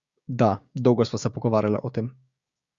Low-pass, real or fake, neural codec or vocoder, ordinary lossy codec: 7.2 kHz; fake; codec, 16 kHz, 6 kbps, DAC; Opus, 64 kbps